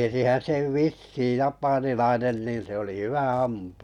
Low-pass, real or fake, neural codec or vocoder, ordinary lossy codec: 19.8 kHz; real; none; none